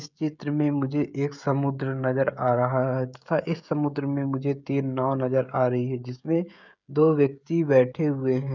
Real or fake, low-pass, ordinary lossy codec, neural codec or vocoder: fake; 7.2 kHz; none; codec, 16 kHz, 16 kbps, FreqCodec, smaller model